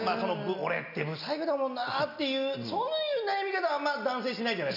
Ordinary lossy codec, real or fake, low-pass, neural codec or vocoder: none; real; 5.4 kHz; none